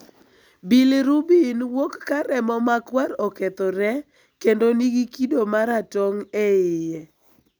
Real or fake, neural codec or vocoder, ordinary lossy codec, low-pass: real; none; none; none